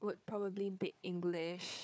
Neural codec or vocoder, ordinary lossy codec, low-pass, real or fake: codec, 16 kHz, 4 kbps, FunCodec, trained on Chinese and English, 50 frames a second; none; none; fake